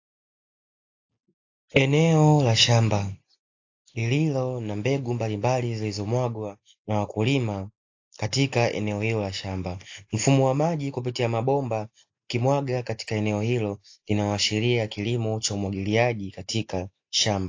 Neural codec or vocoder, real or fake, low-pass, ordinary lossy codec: none; real; 7.2 kHz; AAC, 48 kbps